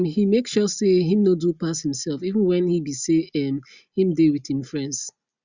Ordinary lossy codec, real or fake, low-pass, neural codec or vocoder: Opus, 64 kbps; real; 7.2 kHz; none